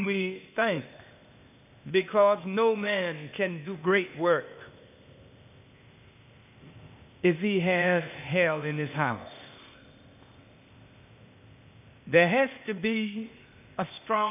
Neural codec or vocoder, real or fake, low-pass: codec, 16 kHz, 0.8 kbps, ZipCodec; fake; 3.6 kHz